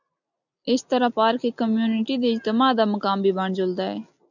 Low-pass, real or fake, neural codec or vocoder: 7.2 kHz; real; none